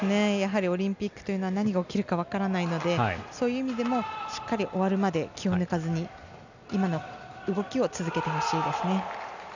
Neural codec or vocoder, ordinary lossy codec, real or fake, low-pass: none; none; real; 7.2 kHz